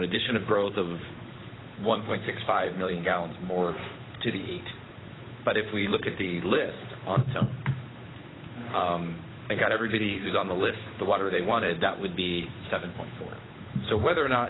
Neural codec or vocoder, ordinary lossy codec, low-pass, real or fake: codec, 16 kHz, 8 kbps, FunCodec, trained on Chinese and English, 25 frames a second; AAC, 16 kbps; 7.2 kHz; fake